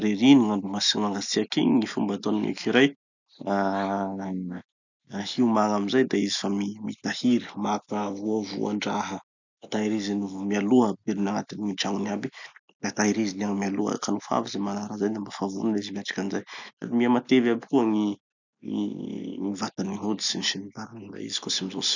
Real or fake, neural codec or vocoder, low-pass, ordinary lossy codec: real; none; 7.2 kHz; none